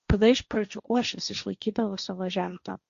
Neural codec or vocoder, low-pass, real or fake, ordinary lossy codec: codec, 16 kHz, 1.1 kbps, Voila-Tokenizer; 7.2 kHz; fake; Opus, 64 kbps